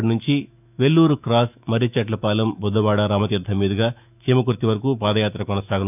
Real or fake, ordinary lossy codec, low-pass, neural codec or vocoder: fake; none; 3.6 kHz; autoencoder, 48 kHz, 128 numbers a frame, DAC-VAE, trained on Japanese speech